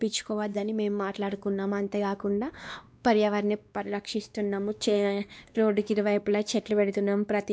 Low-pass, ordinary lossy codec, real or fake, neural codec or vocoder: none; none; fake; codec, 16 kHz, 2 kbps, X-Codec, WavLM features, trained on Multilingual LibriSpeech